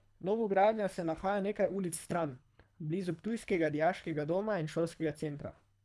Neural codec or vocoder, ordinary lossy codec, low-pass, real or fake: codec, 24 kHz, 3 kbps, HILCodec; none; none; fake